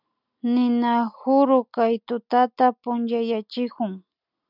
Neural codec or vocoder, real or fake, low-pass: none; real; 5.4 kHz